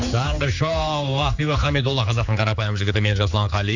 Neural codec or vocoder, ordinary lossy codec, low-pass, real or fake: codec, 16 kHz, 4 kbps, X-Codec, HuBERT features, trained on general audio; none; 7.2 kHz; fake